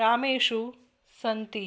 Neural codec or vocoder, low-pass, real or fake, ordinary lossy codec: none; none; real; none